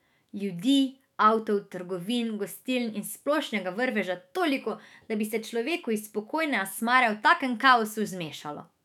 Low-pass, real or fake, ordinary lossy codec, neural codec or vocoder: 19.8 kHz; fake; none; autoencoder, 48 kHz, 128 numbers a frame, DAC-VAE, trained on Japanese speech